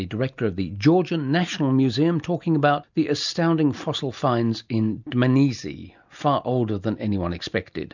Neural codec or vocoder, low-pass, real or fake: none; 7.2 kHz; real